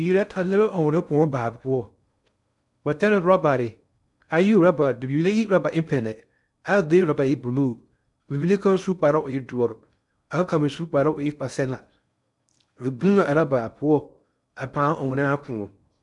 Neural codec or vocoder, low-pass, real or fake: codec, 16 kHz in and 24 kHz out, 0.6 kbps, FocalCodec, streaming, 2048 codes; 10.8 kHz; fake